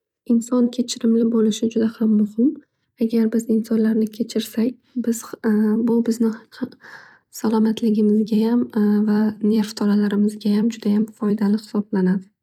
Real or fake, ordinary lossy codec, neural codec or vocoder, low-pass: fake; none; vocoder, 44.1 kHz, 128 mel bands every 256 samples, BigVGAN v2; 19.8 kHz